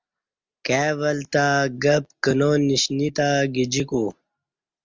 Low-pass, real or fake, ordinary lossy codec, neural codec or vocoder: 7.2 kHz; real; Opus, 32 kbps; none